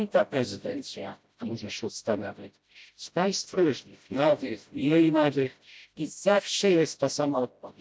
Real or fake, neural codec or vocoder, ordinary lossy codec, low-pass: fake; codec, 16 kHz, 0.5 kbps, FreqCodec, smaller model; none; none